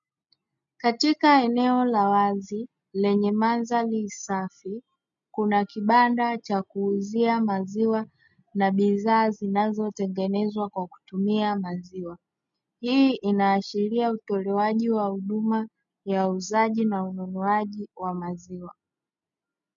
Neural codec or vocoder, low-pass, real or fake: none; 7.2 kHz; real